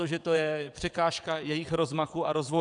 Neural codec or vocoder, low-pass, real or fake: vocoder, 22.05 kHz, 80 mel bands, WaveNeXt; 9.9 kHz; fake